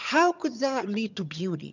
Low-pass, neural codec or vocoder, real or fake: 7.2 kHz; vocoder, 22.05 kHz, 80 mel bands, HiFi-GAN; fake